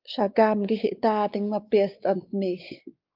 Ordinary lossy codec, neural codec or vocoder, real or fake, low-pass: Opus, 24 kbps; codec, 16 kHz, 4 kbps, X-Codec, WavLM features, trained on Multilingual LibriSpeech; fake; 5.4 kHz